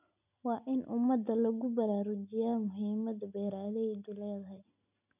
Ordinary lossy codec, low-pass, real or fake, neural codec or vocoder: none; 3.6 kHz; real; none